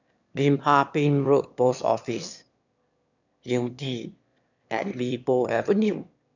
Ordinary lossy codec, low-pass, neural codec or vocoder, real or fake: none; 7.2 kHz; autoencoder, 22.05 kHz, a latent of 192 numbers a frame, VITS, trained on one speaker; fake